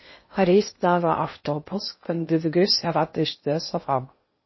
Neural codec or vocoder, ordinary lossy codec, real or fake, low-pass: codec, 16 kHz in and 24 kHz out, 0.6 kbps, FocalCodec, streaming, 4096 codes; MP3, 24 kbps; fake; 7.2 kHz